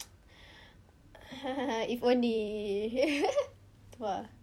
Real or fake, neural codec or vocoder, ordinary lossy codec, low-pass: fake; vocoder, 44.1 kHz, 128 mel bands every 256 samples, BigVGAN v2; none; 19.8 kHz